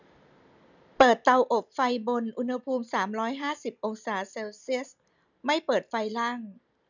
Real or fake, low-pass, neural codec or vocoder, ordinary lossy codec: real; 7.2 kHz; none; none